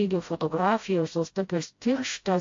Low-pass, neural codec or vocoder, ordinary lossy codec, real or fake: 7.2 kHz; codec, 16 kHz, 0.5 kbps, FreqCodec, smaller model; AAC, 48 kbps; fake